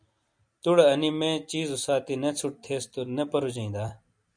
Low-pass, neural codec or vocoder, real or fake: 9.9 kHz; none; real